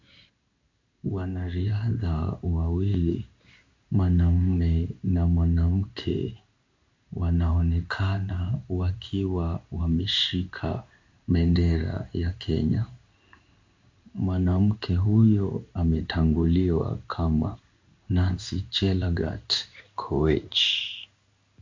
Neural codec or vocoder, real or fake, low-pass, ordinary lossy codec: codec, 16 kHz in and 24 kHz out, 1 kbps, XY-Tokenizer; fake; 7.2 kHz; MP3, 48 kbps